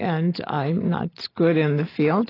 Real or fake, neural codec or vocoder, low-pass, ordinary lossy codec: real; none; 5.4 kHz; AAC, 24 kbps